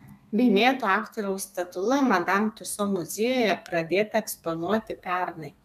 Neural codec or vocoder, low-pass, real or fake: codec, 32 kHz, 1.9 kbps, SNAC; 14.4 kHz; fake